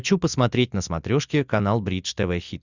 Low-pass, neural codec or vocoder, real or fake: 7.2 kHz; none; real